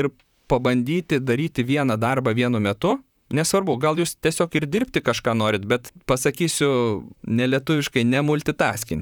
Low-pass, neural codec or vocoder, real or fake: 19.8 kHz; vocoder, 44.1 kHz, 128 mel bands, Pupu-Vocoder; fake